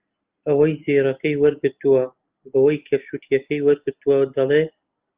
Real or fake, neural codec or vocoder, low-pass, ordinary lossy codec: real; none; 3.6 kHz; Opus, 32 kbps